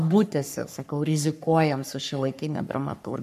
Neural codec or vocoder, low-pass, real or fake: codec, 44.1 kHz, 3.4 kbps, Pupu-Codec; 14.4 kHz; fake